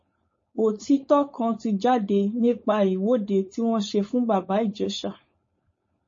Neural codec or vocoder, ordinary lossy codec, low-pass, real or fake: codec, 16 kHz, 4.8 kbps, FACodec; MP3, 32 kbps; 7.2 kHz; fake